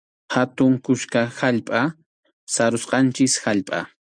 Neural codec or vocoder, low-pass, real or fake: none; 9.9 kHz; real